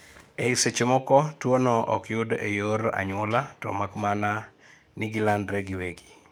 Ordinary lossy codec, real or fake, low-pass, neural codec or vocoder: none; fake; none; codec, 44.1 kHz, 7.8 kbps, DAC